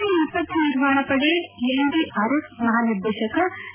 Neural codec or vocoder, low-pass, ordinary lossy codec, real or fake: none; 3.6 kHz; none; real